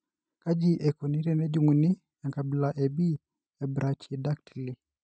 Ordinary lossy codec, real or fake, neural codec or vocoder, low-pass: none; real; none; none